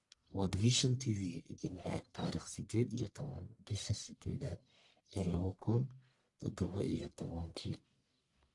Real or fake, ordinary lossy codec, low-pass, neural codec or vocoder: fake; AAC, 64 kbps; 10.8 kHz; codec, 44.1 kHz, 1.7 kbps, Pupu-Codec